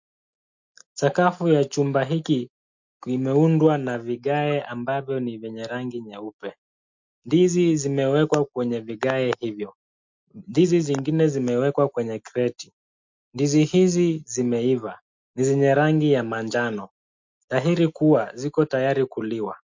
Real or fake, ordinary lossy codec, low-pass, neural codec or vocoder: real; MP3, 48 kbps; 7.2 kHz; none